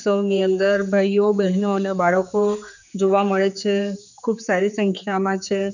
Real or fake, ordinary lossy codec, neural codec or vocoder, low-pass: fake; none; codec, 16 kHz, 4 kbps, X-Codec, HuBERT features, trained on general audio; 7.2 kHz